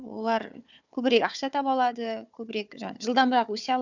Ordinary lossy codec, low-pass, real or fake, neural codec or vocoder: none; 7.2 kHz; fake; codec, 16 kHz, 4 kbps, FunCodec, trained on Chinese and English, 50 frames a second